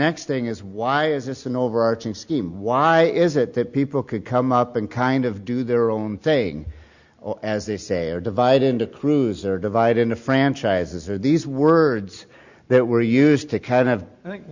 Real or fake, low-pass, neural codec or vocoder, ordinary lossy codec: real; 7.2 kHz; none; Opus, 64 kbps